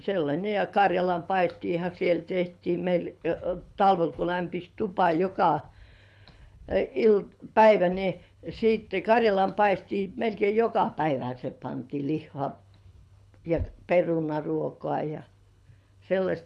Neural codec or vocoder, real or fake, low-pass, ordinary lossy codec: codec, 24 kHz, 6 kbps, HILCodec; fake; none; none